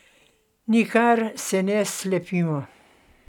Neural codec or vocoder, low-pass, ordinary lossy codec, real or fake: none; 19.8 kHz; none; real